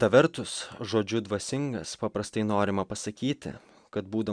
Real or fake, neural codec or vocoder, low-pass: real; none; 9.9 kHz